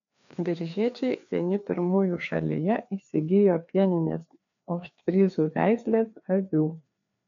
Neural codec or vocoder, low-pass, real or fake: codec, 16 kHz, 2 kbps, FreqCodec, larger model; 7.2 kHz; fake